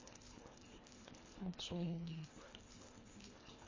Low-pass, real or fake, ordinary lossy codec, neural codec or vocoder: 7.2 kHz; fake; MP3, 32 kbps; codec, 24 kHz, 1.5 kbps, HILCodec